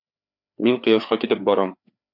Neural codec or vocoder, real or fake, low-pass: codec, 16 kHz, 4 kbps, FreqCodec, larger model; fake; 5.4 kHz